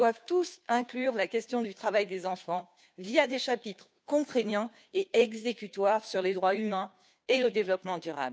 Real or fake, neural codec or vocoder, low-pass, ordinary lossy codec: fake; codec, 16 kHz, 2 kbps, FunCodec, trained on Chinese and English, 25 frames a second; none; none